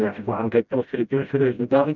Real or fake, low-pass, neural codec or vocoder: fake; 7.2 kHz; codec, 16 kHz, 0.5 kbps, FreqCodec, smaller model